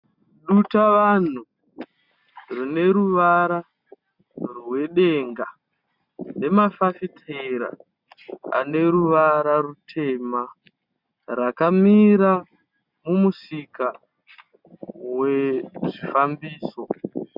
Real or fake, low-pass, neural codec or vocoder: real; 5.4 kHz; none